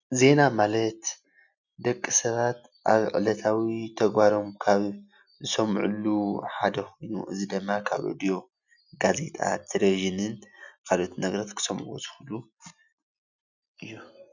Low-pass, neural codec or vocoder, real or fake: 7.2 kHz; none; real